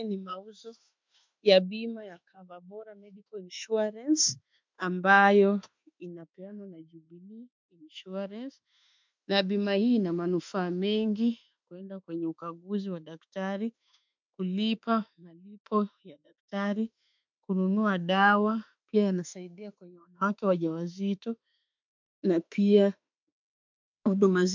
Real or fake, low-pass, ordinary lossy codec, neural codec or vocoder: fake; 7.2 kHz; MP3, 64 kbps; autoencoder, 48 kHz, 32 numbers a frame, DAC-VAE, trained on Japanese speech